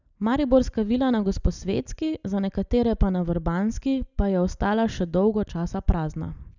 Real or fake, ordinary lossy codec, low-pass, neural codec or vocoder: real; none; 7.2 kHz; none